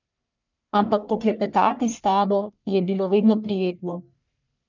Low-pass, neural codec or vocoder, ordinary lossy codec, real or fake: 7.2 kHz; codec, 44.1 kHz, 1.7 kbps, Pupu-Codec; none; fake